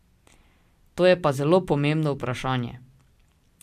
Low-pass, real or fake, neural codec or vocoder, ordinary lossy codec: 14.4 kHz; real; none; MP3, 96 kbps